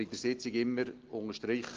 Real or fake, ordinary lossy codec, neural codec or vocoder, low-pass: real; Opus, 16 kbps; none; 7.2 kHz